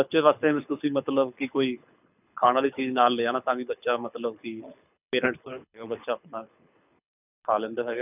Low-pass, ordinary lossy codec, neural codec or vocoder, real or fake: 3.6 kHz; none; codec, 24 kHz, 6 kbps, HILCodec; fake